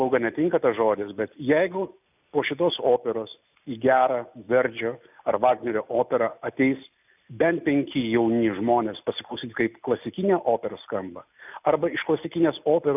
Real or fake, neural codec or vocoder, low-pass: real; none; 3.6 kHz